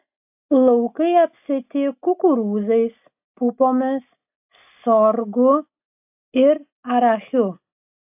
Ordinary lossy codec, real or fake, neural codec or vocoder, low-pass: AAC, 32 kbps; real; none; 3.6 kHz